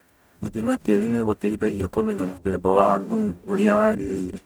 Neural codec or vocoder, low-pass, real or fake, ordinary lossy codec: codec, 44.1 kHz, 0.9 kbps, DAC; none; fake; none